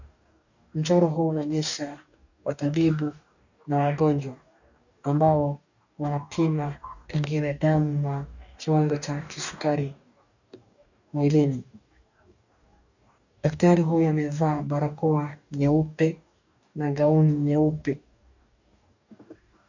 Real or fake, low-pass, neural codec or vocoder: fake; 7.2 kHz; codec, 44.1 kHz, 2.6 kbps, DAC